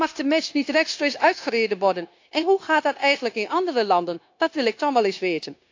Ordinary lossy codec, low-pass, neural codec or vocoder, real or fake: AAC, 48 kbps; 7.2 kHz; codec, 16 kHz, 0.9 kbps, LongCat-Audio-Codec; fake